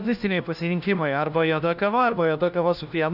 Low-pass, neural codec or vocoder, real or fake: 5.4 kHz; codec, 16 kHz, 1 kbps, FunCodec, trained on LibriTTS, 50 frames a second; fake